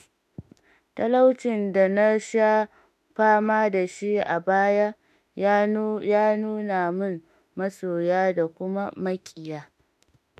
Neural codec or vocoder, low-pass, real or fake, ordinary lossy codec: autoencoder, 48 kHz, 32 numbers a frame, DAC-VAE, trained on Japanese speech; 14.4 kHz; fake; MP3, 96 kbps